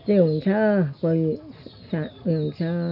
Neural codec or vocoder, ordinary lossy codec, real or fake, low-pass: none; none; real; 5.4 kHz